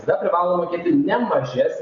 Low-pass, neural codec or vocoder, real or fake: 7.2 kHz; none; real